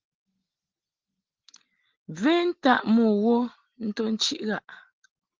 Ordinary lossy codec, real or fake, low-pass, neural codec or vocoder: Opus, 16 kbps; real; 7.2 kHz; none